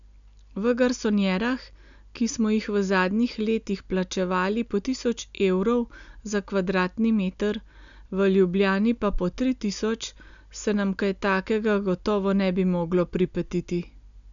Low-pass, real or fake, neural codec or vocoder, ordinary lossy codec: 7.2 kHz; real; none; none